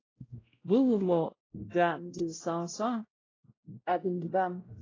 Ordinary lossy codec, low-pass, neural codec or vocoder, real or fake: AAC, 32 kbps; 7.2 kHz; codec, 16 kHz, 0.5 kbps, X-Codec, WavLM features, trained on Multilingual LibriSpeech; fake